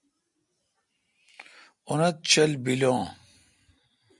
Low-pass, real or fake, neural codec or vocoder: 10.8 kHz; real; none